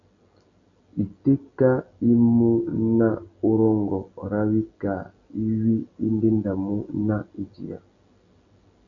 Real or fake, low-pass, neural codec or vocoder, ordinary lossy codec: real; 7.2 kHz; none; MP3, 96 kbps